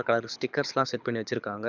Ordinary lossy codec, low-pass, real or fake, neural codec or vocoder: none; 7.2 kHz; fake; codec, 24 kHz, 6 kbps, HILCodec